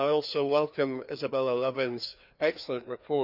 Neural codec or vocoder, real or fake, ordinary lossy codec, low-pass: codec, 16 kHz, 2 kbps, FreqCodec, larger model; fake; none; 5.4 kHz